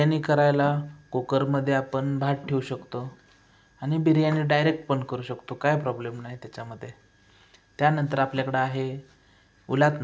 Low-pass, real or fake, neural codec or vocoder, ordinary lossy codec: none; real; none; none